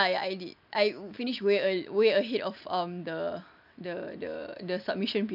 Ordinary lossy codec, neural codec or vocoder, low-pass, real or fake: none; none; 5.4 kHz; real